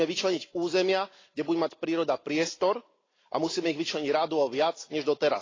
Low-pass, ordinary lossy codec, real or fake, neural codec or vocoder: 7.2 kHz; AAC, 32 kbps; real; none